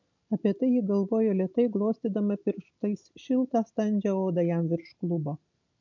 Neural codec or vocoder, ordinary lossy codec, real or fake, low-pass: none; MP3, 48 kbps; real; 7.2 kHz